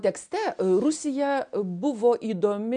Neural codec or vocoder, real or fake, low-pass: none; real; 9.9 kHz